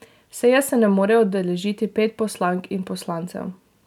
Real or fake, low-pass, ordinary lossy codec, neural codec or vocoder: real; 19.8 kHz; none; none